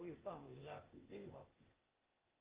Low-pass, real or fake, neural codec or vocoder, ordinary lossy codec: 3.6 kHz; fake; codec, 16 kHz, 0.8 kbps, ZipCodec; Opus, 32 kbps